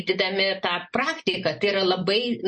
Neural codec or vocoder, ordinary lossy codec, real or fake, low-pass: none; MP3, 32 kbps; real; 10.8 kHz